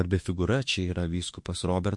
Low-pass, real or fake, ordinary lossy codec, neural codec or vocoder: 10.8 kHz; fake; MP3, 48 kbps; autoencoder, 48 kHz, 32 numbers a frame, DAC-VAE, trained on Japanese speech